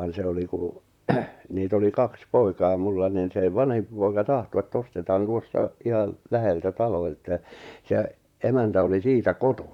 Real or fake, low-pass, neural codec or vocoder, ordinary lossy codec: fake; 19.8 kHz; vocoder, 44.1 kHz, 128 mel bands, Pupu-Vocoder; none